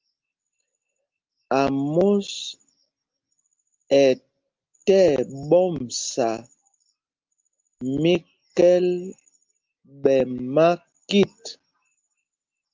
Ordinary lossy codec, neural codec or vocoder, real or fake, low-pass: Opus, 32 kbps; none; real; 7.2 kHz